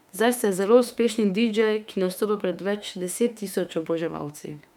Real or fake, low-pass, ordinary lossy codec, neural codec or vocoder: fake; 19.8 kHz; none; autoencoder, 48 kHz, 32 numbers a frame, DAC-VAE, trained on Japanese speech